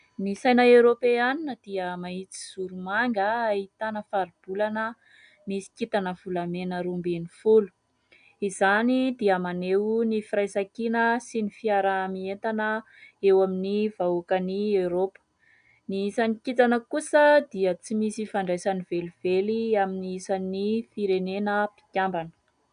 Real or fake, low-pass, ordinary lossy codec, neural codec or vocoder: real; 9.9 kHz; AAC, 96 kbps; none